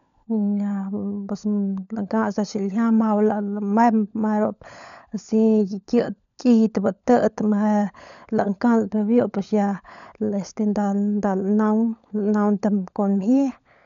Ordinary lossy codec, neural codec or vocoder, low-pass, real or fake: none; codec, 16 kHz, 16 kbps, FunCodec, trained on LibriTTS, 50 frames a second; 7.2 kHz; fake